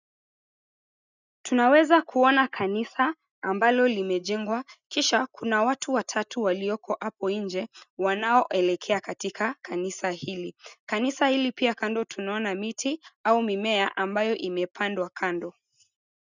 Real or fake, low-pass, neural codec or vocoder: real; 7.2 kHz; none